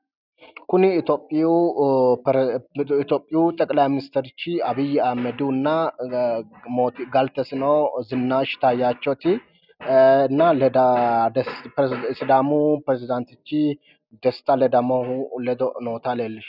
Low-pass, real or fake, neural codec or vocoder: 5.4 kHz; real; none